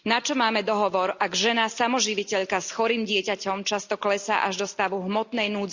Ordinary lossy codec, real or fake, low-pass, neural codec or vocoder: Opus, 64 kbps; real; 7.2 kHz; none